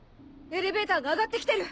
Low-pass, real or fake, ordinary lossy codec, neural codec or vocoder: none; real; none; none